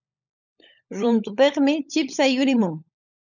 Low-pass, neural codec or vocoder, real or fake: 7.2 kHz; codec, 16 kHz, 16 kbps, FunCodec, trained on LibriTTS, 50 frames a second; fake